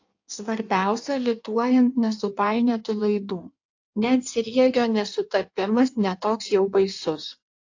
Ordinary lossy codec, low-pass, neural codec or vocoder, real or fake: AAC, 48 kbps; 7.2 kHz; codec, 16 kHz in and 24 kHz out, 1.1 kbps, FireRedTTS-2 codec; fake